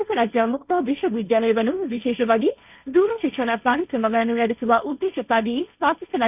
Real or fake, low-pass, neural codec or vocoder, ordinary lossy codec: fake; 3.6 kHz; codec, 16 kHz, 1.1 kbps, Voila-Tokenizer; none